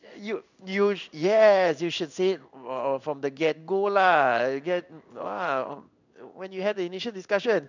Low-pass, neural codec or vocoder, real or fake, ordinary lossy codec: 7.2 kHz; codec, 16 kHz in and 24 kHz out, 1 kbps, XY-Tokenizer; fake; none